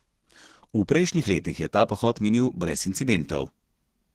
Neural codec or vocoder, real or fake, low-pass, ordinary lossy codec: codec, 32 kHz, 1.9 kbps, SNAC; fake; 14.4 kHz; Opus, 16 kbps